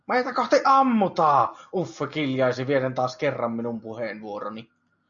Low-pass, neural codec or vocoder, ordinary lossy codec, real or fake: 7.2 kHz; none; MP3, 96 kbps; real